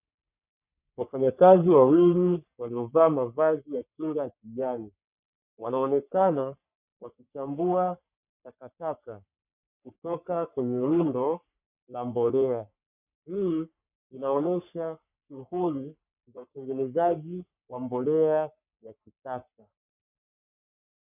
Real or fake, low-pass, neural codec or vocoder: fake; 3.6 kHz; codec, 44.1 kHz, 3.4 kbps, Pupu-Codec